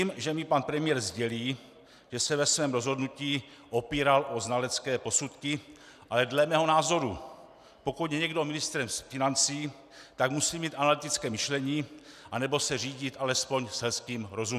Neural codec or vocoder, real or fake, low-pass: none; real; 14.4 kHz